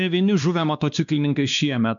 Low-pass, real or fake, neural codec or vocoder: 7.2 kHz; fake; codec, 16 kHz, 2 kbps, X-Codec, WavLM features, trained on Multilingual LibriSpeech